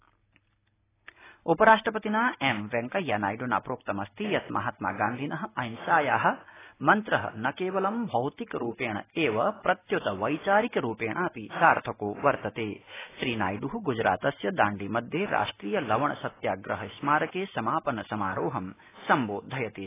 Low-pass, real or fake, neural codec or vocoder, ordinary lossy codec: 3.6 kHz; real; none; AAC, 16 kbps